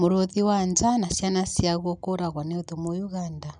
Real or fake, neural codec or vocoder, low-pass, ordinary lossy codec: real; none; 9.9 kHz; none